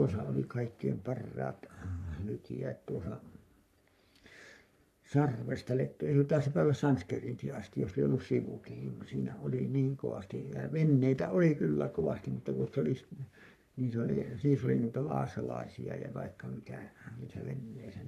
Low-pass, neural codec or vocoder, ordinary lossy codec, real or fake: 14.4 kHz; codec, 44.1 kHz, 3.4 kbps, Pupu-Codec; none; fake